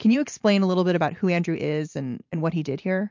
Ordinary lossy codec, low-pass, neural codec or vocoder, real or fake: MP3, 48 kbps; 7.2 kHz; vocoder, 44.1 kHz, 128 mel bands every 512 samples, BigVGAN v2; fake